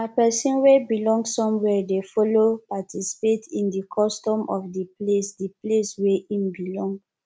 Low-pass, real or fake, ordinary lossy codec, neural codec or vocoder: none; real; none; none